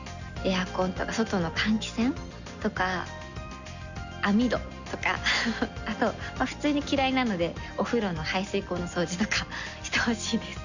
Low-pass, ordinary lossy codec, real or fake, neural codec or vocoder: 7.2 kHz; none; real; none